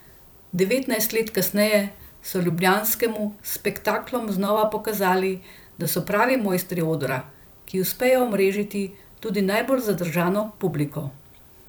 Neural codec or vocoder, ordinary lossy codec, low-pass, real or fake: vocoder, 44.1 kHz, 128 mel bands every 256 samples, BigVGAN v2; none; none; fake